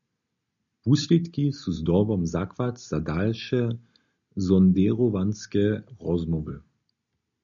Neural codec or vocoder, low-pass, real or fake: none; 7.2 kHz; real